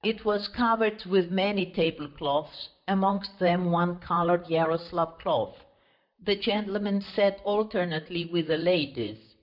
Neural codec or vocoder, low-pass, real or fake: vocoder, 44.1 kHz, 128 mel bands, Pupu-Vocoder; 5.4 kHz; fake